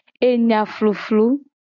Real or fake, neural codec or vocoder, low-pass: real; none; 7.2 kHz